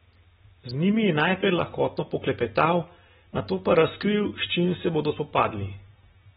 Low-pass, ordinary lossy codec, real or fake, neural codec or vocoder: 19.8 kHz; AAC, 16 kbps; real; none